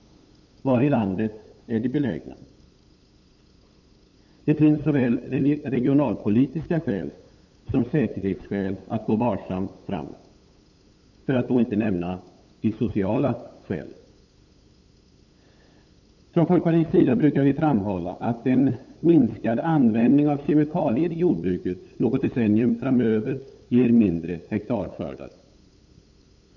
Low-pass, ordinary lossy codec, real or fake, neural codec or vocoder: 7.2 kHz; none; fake; codec, 16 kHz, 8 kbps, FunCodec, trained on LibriTTS, 25 frames a second